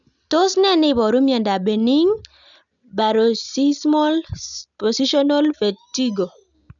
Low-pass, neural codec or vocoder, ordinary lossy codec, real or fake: 7.2 kHz; none; none; real